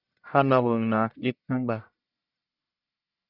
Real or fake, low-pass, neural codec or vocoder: fake; 5.4 kHz; codec, 44.1 kHz, 1.7 kbps, Pupu-Codec